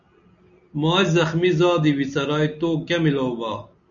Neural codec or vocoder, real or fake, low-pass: none; real; 7.2 kHz